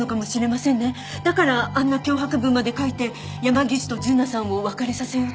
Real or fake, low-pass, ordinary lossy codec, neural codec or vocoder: real; none; none; none